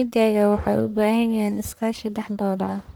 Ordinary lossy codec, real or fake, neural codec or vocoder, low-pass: none; fake; codec, 44.1 kHz, 1.7 kbps, Pupu-Codec; none